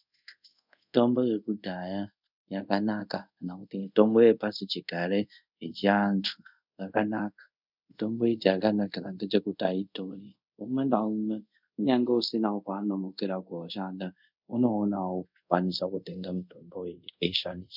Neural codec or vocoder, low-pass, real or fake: codec, 24 kHz, 0.5 kbps, DualCodec; 5.4 kHz; fake